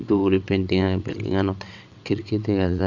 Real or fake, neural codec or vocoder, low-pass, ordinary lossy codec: fake; vocoder, 22.05 kHz, 80 mel bands, WaveNeXt; 7.2 kHz; none